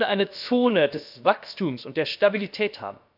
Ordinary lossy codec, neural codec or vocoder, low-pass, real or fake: none; codec, 16 kHz, about 1 kbps, DyCAST, with the encoder's durations; 5.4 kHz; fake